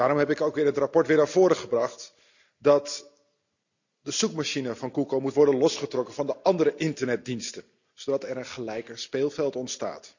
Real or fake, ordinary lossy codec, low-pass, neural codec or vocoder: real; none; 7.2 kHz; none